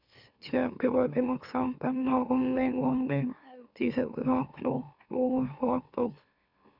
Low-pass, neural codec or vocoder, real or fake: 5.4 kHz; autoencoder, 44.1 kHz, a latent of 192 numbers a frame, MeloTTS; fake